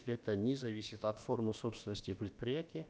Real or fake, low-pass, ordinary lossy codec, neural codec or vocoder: fake; none; none; codec, 16 kHz, about 1 kbps, DyCAST, with the encoder's durations